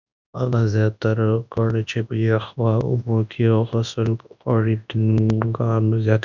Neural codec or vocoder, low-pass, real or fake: codec, 24 kHz, 0.9 kbps, WavTokenizer, large speech release; 7.2 kHz; fake